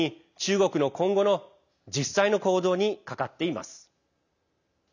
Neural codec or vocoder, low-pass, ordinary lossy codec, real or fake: none; 7.2 kHz; none; real